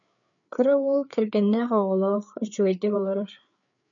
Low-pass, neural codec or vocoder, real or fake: 7.2 kHz; codec, 16 kHz, 4 kbps, FreqCodec, larger model; fake